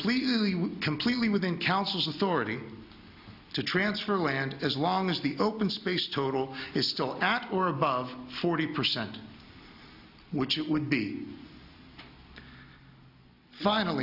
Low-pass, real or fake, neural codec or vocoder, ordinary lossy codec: 5.4 kHz; real; none; AAC, 48 kbps